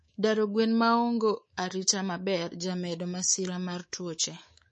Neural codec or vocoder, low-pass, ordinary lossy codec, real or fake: codec, 24 kHz, 3.1 kbps, DualCodec; 10.8 kHz; MP3, 32 kbps; fake